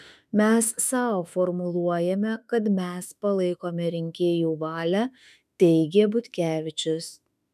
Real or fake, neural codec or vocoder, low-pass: fake; autoencoder, 48 kHz, 32 numbers a frame, DAC-VAE, trained on Japanese speech; 14.4 kHz